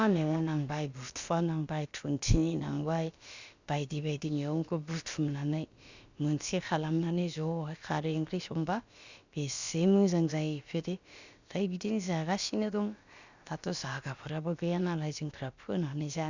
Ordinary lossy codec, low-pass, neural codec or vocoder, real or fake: Opus, 64 kbps; 7.2 kHz; codec, 16 kHz, about 1 kbps, DyCAST, with the encoder's durations; fake